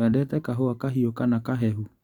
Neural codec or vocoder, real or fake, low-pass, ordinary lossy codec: none; real; 19.8 kHz; Opus, 64 kbps